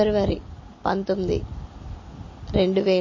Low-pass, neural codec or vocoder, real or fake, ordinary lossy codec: 7.2 kHz; none; real; MP3, 32 kbps